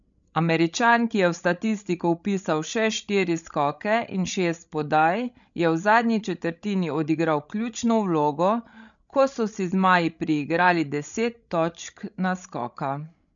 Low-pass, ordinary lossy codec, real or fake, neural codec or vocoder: 7.2 kHz; none; fake; codec, 16 kHz, 16 kbps, FreqCodec, larger model